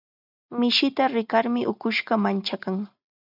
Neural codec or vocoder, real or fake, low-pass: none; real; 5.4 kHz